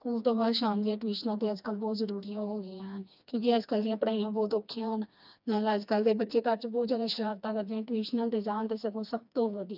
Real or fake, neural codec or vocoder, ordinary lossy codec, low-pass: fake; codec, 16 kHz, 2 kbps, FreqCodec, smaller model; none; 5.4 kHz